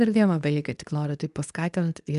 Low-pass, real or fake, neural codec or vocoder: 10.8 kHz; fake; codec, 24 kHz, 0.9 kbps, WavTokenizer, medium speech release version 2